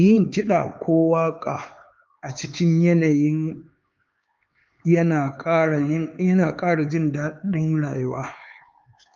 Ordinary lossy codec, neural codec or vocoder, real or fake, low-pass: Opus, 32 kbps; codec, 16 kHz, 4 kbps, X-Codec, HuBERT features, trained on LibriSpeech; fake; 7.2 kHz